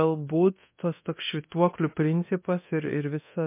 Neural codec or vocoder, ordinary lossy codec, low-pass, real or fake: codec, 24 kHz, 0.9 kbps, DualCodec; MP3, 24 kbps; 3.6 kHz; fake